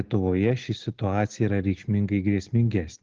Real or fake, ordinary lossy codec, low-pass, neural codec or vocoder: real; Opus, 16 kbps; 7.2 kHz; none